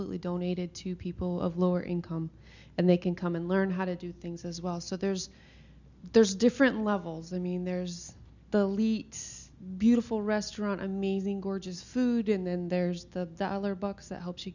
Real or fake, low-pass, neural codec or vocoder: real; 7.2 kHz; none